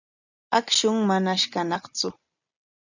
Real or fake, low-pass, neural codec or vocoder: real; 7.2 kHz; none